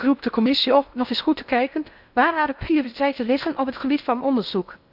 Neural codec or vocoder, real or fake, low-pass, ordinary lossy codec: codec, 16 kHz in and 24 kHz out, 0.8 kbps, FocalCodec, streaming, 65536 codes; fake; 5.4 kHz; none